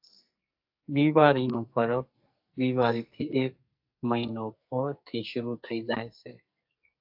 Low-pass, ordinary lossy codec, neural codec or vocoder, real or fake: 5.4 kHz; AAC, 48 kbps; codec, 44.1 kHz, 2.6 kbps, SNAC; fake